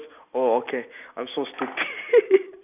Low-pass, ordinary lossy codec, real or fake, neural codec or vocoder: 3.6 kHz; none; real; none